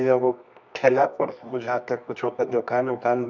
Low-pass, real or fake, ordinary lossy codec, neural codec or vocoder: 7.2 kHz; fake; none; codec, 24 kHz, 0.9 kbps, WavTokenizer, medium music audio release